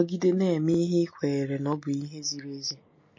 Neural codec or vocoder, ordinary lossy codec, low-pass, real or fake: none; MP3, 32 kbps; 7.2 kHz; real